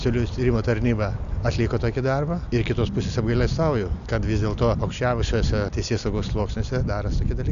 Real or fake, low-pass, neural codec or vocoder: real; 7.2 kHz; none